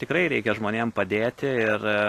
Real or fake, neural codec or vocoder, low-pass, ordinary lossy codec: real; none; 14.4 kHz; AAC, 48 kbps